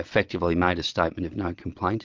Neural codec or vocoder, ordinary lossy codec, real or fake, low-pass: vocoder, 22.05 kHz, 80 mel bands, Vocos; Opus, 24 kbps; fake; 7.2 kHz